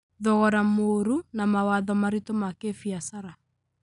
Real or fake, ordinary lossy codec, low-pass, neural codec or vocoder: real; none; 10.8 kHz; none